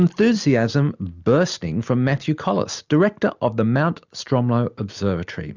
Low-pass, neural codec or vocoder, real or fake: 7.2 kHz; none; real